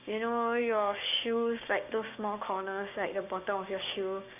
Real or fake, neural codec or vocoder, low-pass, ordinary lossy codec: fake; codec, 16 kHz, 6 kbps, DAC; 3.6 kHz; none